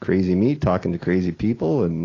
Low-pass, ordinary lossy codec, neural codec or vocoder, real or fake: 7.2 kHz; AAC, 32 kbps; none; real